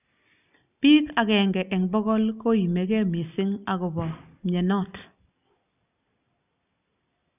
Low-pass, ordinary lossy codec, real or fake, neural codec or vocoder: 3.6 kHz; none; real; none